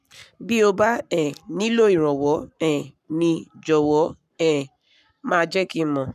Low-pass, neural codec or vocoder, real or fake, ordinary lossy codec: 14.4 kHz; codec, 44.1 kHz, 7.8 kbps, Pupu-Codec; fake; none